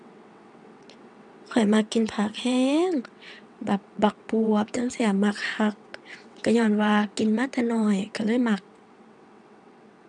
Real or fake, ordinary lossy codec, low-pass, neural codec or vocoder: fake; none; 9.9 kHz; vocoder, 22.05 kHz, 80 mel bands, WaveNeXt